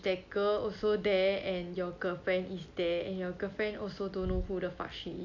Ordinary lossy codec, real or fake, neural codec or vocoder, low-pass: none; real; none; 7.2 kHz